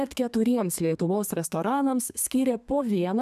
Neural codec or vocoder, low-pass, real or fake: codec, 32 kHz, 1.9 kbps, SNAC; 14.4 kHz; fake